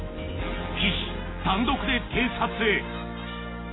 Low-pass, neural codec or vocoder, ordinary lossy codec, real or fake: 7.2 kHz; none; AAC, 16 kbps; real